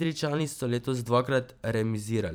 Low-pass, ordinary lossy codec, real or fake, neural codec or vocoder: none; none; fake; vocoder, 44.1 kHz, 128 mel bands every 512 samples, BigVGAN v2